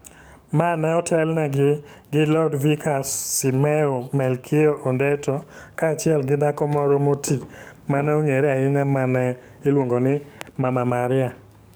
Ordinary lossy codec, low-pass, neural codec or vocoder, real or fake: none; none; codec, 44.1 kHz, 7.8 kbps, DAC; fake